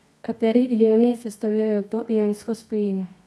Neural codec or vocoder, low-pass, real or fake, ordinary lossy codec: codec, 24 kHz, 0.9 kbps, WavTokenizer, medium music audio release; none; fake; none